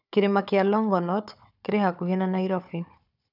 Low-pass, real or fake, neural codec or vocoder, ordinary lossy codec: 5.4 kHz; fake; codec, 16 kHz, 4 kbps, FunCodec, trained on Chinese and English, 50 frames a second; none